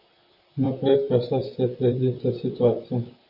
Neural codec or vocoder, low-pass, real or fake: vocoder, 44.1 kHz, 128 mel bands, Pupu-Vocoder; 5.4 kHz; fake